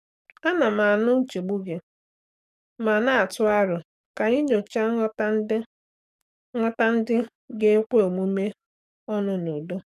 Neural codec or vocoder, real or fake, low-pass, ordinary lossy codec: codec, 44.1 kHz, 7.8 kbps, DAC; fake; 14.4 kHz; none